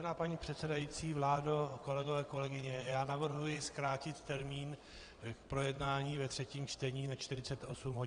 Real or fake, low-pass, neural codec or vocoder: fake; 9.9 kHz; vocoder, 22.05 kHz, 80 mel bands, WaveNeXt